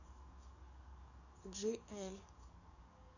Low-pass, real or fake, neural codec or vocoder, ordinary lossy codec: 7.2 kHz; fake; codec, 44.1 kHz, 7.8 kbps, DAC; none